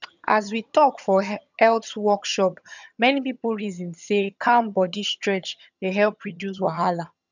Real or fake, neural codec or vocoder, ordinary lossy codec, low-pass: fake; vocoder, 22.05 kHz, 80 mel bands, HiFi-GAN; none; 7.2 kHz